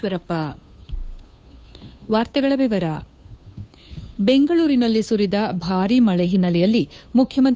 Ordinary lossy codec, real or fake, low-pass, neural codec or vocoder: none; fake; none; codec, 16 kHz, 2 kbps, FunCodec, trained on Chinese and English, 25 frames a second